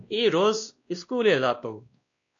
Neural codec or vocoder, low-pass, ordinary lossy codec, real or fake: codec, 16 kHz, 1 kbps, X-Codec, WavLM features, trained on Multilingual LibriSpeech; 7.2 kHz; AAC, 64 kbps; fake